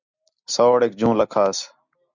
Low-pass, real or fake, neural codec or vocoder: 7.2 kHz; real; none